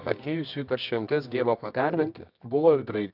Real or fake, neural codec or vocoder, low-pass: fake; codec, 24 kHz, 0.9 kbps, WavTokenizer, medium music audio release; 5.4 kHz